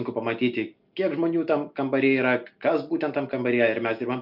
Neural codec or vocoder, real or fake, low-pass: none; real; 5.4 kHz